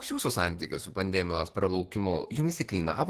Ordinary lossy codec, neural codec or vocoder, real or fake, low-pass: Opus, 16 kbps; codec, 32 kHz, 1.9 kbps, SNAC; fake; 14.4 kHz